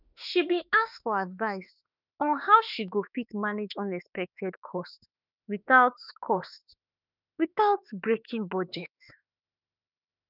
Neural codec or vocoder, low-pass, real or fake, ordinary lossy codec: autoencoder, 48 kHz, 32 numbers a frame, DAC-VAE, trained on Japanese speech; 5.4 kHz; fake; none